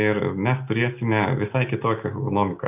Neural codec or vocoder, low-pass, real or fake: none; 3.6 kHz; real